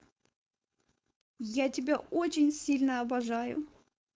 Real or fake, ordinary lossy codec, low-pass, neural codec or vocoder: fake; none; none; codec, 16 kHz, 4.8 kbps, FACodec